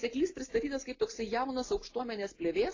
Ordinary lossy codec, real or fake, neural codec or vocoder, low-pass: AAC, 32 kbps; real; none; 7.2 kHz